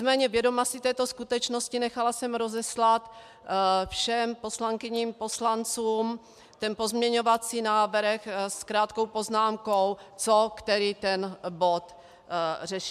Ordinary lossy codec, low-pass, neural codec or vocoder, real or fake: MP3, 96 kbps; 14.4 kHz; none; real